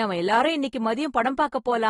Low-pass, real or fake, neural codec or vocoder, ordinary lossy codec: 10.8 kHz; real; none; AAC, 32 kbps